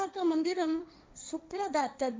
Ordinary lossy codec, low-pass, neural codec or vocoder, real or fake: none; none; codec, 16 kHz, 1.1 kbps, Voila-Tokenizer; fake